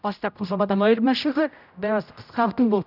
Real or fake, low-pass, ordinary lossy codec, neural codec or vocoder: fake; 5.4 kHz; none; codec, 16 kHz, 0.5 kbps, X-Codec, HuBERT features, trained on general audio